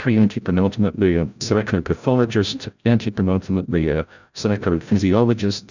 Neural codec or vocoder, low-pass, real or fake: codec, 16 kHz, 0.5 kbps, FreqCodec, larger model; 7.2 kHz; fake